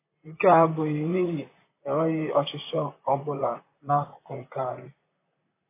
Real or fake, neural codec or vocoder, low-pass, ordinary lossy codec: fake; vocoder, 44.1 kHz, 128 mel bands, Pupu-Vocoder; 3.6 kHz; AAC, 24 kbps